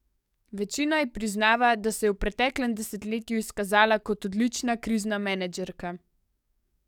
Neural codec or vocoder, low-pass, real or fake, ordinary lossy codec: codec, 44.1 kHz, 7.8 kbps, DAC; 19.8 kHz; fake; none